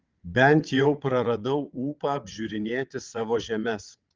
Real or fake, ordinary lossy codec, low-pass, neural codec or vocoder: fake; Opus, 32 kbps; 7.2 kHz; vocoder, 22.05 kHz, 80 mel bands, WaveNeXt